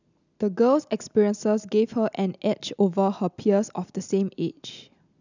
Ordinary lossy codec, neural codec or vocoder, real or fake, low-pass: none; none; real; 7.2 kHz